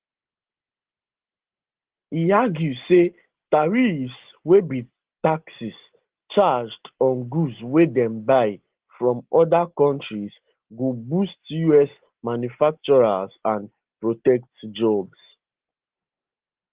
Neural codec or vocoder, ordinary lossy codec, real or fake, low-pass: none; Opus, 16 kbps; real; 3.6 kHz